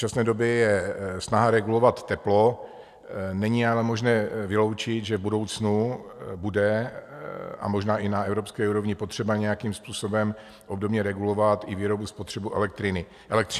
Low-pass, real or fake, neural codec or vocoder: 14.4 kHz; real; none